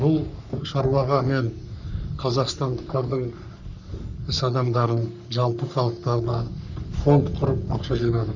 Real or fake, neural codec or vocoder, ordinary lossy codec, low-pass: fake; codec, 44.1 kHz, 3.4 kbps, Pupu-Codec; none; 7.2 kHz